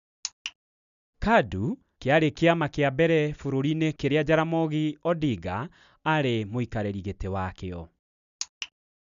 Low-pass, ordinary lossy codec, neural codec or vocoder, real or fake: 7.2 kHz; none; none; real